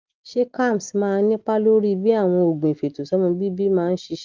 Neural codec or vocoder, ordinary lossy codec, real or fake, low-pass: none; Opus, 24 kbps; real; 7.2 kHz